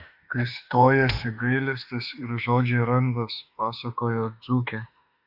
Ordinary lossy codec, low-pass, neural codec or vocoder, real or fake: Opus, 64 kbps; 5.4 kHz; autoencoder, 48 kHz, 32 numbers a frame, DAC-VAE, trained on Japanese speech; fake